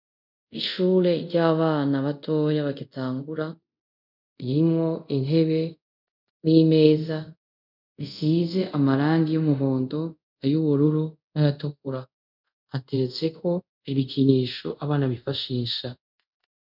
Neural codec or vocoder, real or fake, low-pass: codec, 24 kHz, 0.5 kbps, DualCodec; fake; 5.4 kHz